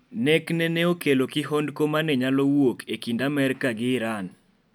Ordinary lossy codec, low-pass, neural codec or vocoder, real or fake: none; 19.8 kHz; none; real